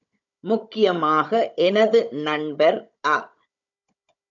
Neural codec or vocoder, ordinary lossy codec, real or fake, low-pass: codec, 16 kHz, 16 kbps, FunCodec, trained on Chinese and English, 50 frames a second; MP3, 96 kbps; fake; 7.2 kHz